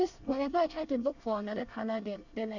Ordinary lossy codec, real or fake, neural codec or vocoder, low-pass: none; fake; codec, 24 kHz, 1 kbps, SNAC; 7.2 kHz